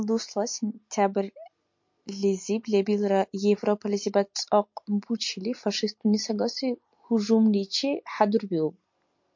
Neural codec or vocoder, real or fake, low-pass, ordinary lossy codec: none; real; 7.2 kHz; MP3, 48 kbps